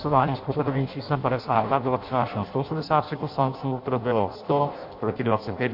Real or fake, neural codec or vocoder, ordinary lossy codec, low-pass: fake; codec, 16 kHz in and 24 kHz out, 0.6 kbps, FireRedTTS-2 codec; MP3, 48 kbps; 5.4 kHz